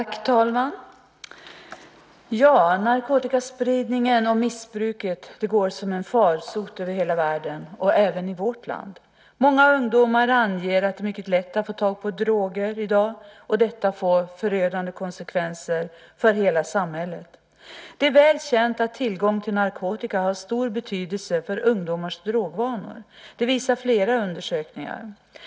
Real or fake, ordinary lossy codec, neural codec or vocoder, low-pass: real; none; none; none